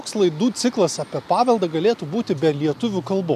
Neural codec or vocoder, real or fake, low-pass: none; real; 14.4 kHz